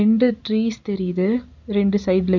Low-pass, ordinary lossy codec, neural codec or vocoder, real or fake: 7.2 kHz; none; none; real